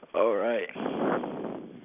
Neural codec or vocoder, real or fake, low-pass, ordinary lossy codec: none; real; 3.6 kHz; none